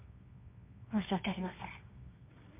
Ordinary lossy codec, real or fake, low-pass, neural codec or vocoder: MP3, 32 kbps; fake; 3.6 kHz; codec, 24 kHz, 0.9 kbps, WavTokenizer, medium music audio release